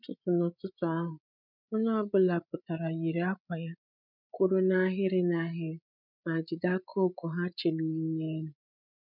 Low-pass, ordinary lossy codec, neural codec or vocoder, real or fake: 5.4 kHz; none; codec, 16 kHz, 8 kbps, FreqCodec, larger model; fake